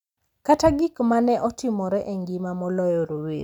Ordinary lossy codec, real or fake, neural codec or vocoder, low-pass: none; real; none; 19.8 kHz